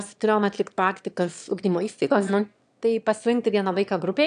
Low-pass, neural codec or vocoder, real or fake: 9.9 kHz; autoencoder, 22.05 kHz, a latent of 192 numbers a frame, VITS, trained on one speaker; fake